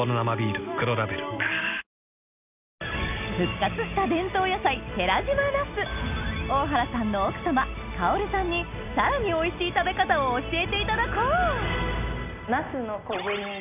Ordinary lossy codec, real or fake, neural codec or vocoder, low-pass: none; real; none; 3.6 kHz